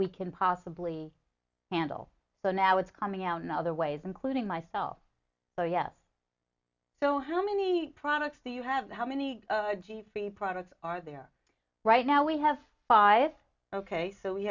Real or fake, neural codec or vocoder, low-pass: real; none; 7.2 kHz